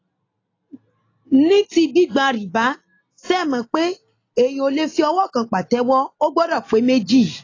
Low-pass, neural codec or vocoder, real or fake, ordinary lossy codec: 7.2 kHz; none; real; AAC, 32 kbps